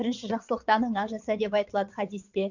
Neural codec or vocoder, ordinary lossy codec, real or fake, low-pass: vocoder, 22.05 kHz, 80 mel bands, WaveNeXt; none; fake; 7.2 kHz